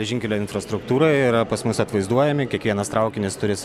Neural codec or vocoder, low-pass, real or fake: none; 14.4 kHz; real